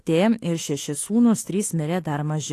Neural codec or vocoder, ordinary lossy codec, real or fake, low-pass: autoencoder, 48 kHz, 32 numbers a frame, DAC-VAE, trained on Japanese speech; AAC, 48 kbps; fake; 14.4 kHz